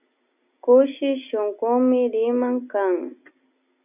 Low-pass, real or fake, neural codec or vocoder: 3.6 kHz; real; none